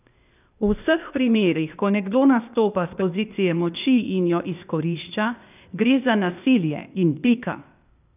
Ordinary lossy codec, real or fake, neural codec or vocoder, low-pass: none; fake; codec, 16 kHz, 0.8 kbps, ZipCodec; 3.6 kHz